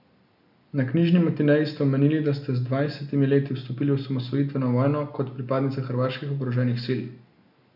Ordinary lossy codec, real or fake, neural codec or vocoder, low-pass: none; real; none; 5.4 kHz